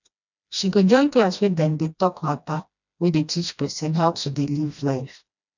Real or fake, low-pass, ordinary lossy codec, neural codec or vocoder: fake; 7.2 kHz; none; codec, 16 kHz, 1 kbps, FreqCodec, smaller model